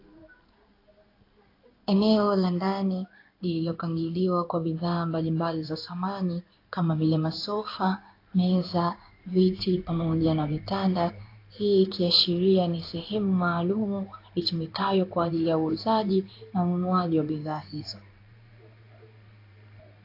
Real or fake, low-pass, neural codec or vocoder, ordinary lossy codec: fake; 5.4 kHz; codec, 16 kHz in and 24 kHz out, 1 kbps, XY-Tokenizer; AAC, 32 kbps